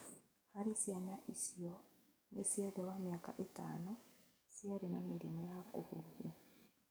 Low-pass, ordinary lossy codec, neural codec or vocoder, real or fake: none; none; codec, 44.1 kHz, 7.8 kbps, DAC; fake